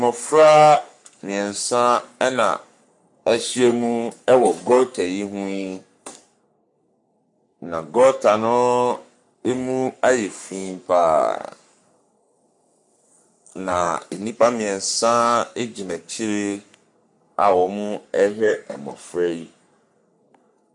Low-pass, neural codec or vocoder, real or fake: 10.8 kHz; codec, 44.1 kHz, 3.4 kbps, Pupu-Codec; fake